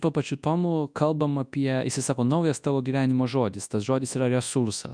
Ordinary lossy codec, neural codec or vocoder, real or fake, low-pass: MP3, 96 kbps; codec, 24 kHz, 0.9 kbps, WavTokenizer, large speech release; fake; 9.9 kHz